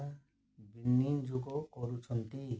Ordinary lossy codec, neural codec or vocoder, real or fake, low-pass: none; none; real; none